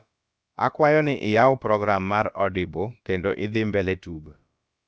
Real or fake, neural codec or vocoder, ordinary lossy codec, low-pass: fake; codec, 16 kHz, about 1 kbps, DyCAST, with the encoder's durations; none; none